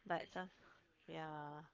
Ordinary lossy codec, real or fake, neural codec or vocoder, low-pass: Opus, 32 kbps; fake; codec, 44.1 kHz, 7.8 kbps, Pupu-Codec; 7.2 kHz